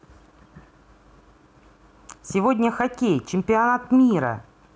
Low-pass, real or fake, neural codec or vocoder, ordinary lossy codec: none; real; none; none